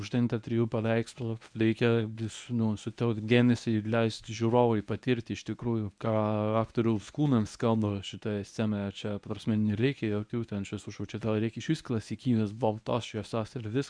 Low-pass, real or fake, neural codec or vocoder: 9.9 kHz; fake; codec, 24 kHz, 0.9 kbps, WavTokenizer, medium speech release version 1